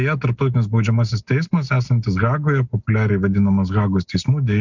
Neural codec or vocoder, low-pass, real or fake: none; 7.2 kHz; real